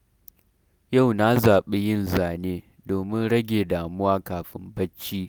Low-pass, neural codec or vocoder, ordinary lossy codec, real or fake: none; none; none; real